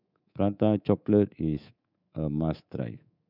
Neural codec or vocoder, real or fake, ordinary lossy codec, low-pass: codec, 24 kHz, 3.1 kbps, DualCodec; fake; none; 5.4 kHz